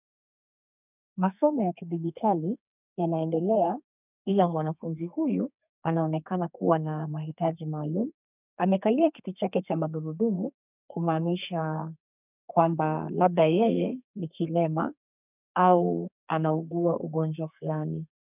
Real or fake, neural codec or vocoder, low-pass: fake; codec, 32 kHz, 1.9 kbps, SNAC; 3.6 kHz